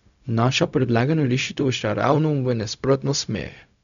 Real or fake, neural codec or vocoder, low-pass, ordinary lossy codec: fake; codec, 16 kHz, 0.4 kbps, LongCat-Audio-Codec; 7.2 kHz; none